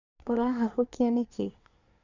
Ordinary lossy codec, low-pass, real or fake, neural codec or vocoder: none; 7.2 kHz; fake; codec, 24 kHz, 1 kbps, SNAC